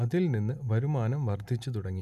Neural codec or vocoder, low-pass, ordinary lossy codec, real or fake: none; 14.4 kHz; none; real